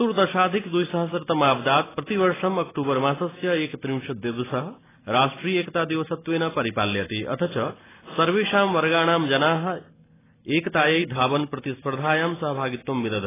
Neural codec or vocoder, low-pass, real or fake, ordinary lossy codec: none; 3.6 kHz; real; AAC, 16 kbps